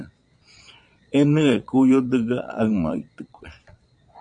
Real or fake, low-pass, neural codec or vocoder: fake; 9.9 kHz; vocoder, 22.05 kHz, 80 mel bands, Vocos